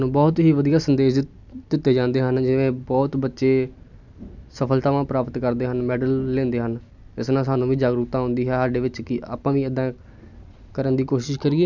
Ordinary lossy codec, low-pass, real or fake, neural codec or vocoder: none; 7.2 kHz; real; none